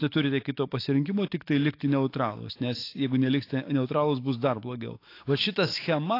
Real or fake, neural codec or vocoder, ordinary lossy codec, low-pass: fake; autoencoder, 48 kHz, 128 numbers a frame, DAC-VAE, trained on Japanese speech; AAC, 32 kbps; 5.4 kHz